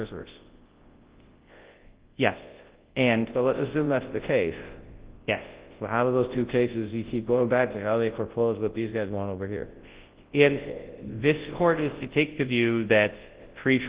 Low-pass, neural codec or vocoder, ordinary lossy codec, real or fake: 3.6 kHz; codec, 24 kHz, 0.9 kbps, WavTokenizer, large speech release; Opus, 16 kbps; fake